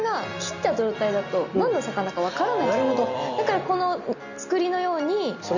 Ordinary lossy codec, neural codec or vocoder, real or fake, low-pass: none; none; real; 7.2 kHz